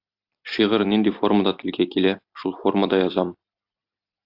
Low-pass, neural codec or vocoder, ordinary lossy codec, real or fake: 5.4 kHz; vocoder, 44.1 kHz, 128 mel bands every 256 samples, BigVGAN v2; AAC, 48 kbps; fake